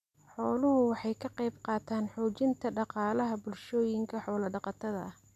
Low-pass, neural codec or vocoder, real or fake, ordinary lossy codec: 14.4 kHz; none; real; none